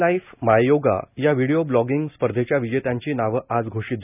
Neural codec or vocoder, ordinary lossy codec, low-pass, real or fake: none; none; 3.6 kHz; real